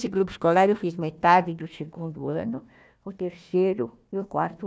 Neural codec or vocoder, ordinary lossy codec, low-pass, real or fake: codec, 16 kHz, 1 kbps, FunCodec, trained on Chinese and English, 50 frames a second; none; none; fake